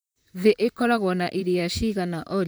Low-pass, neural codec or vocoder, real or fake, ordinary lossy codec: none; vocoder, 44.1 kHz, 128 mel bands, Pupu-Vocoder; fake; none